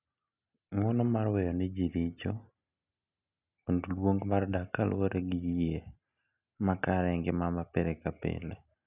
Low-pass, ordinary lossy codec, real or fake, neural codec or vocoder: 3.6 kHz; none; real; none